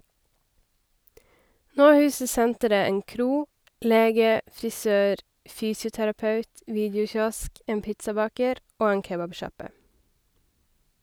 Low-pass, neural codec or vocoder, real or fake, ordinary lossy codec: none; none; real; none